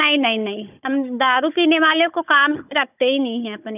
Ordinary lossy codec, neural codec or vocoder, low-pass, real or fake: none; codec, 16 kHz, 16 kbps, FunCodec, trained on Chinese and English, 50 frames a second; 3.6 kHz; fake